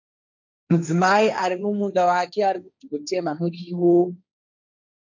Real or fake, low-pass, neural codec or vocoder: fake; 7.2 kHz; codec, 16 kHz, 1.1 kbps, Voila-Tokenizer